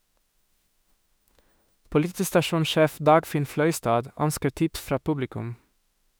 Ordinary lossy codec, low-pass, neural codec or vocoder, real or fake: none; none; autoencoder, 48 kHz, 32 numbers a frame, DAC-VAE, trained on Japanese speech; fake